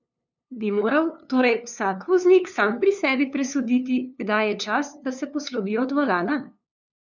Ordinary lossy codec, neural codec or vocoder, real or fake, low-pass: none; codec, 16 kHz, 2 kbps, FunCodec, trained on LibriTTS, 25 frames a second; fake; 7.2 kHz